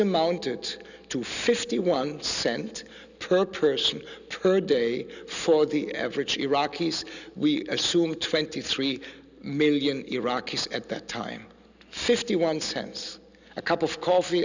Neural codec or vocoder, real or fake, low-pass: none; real; 7.2 kHz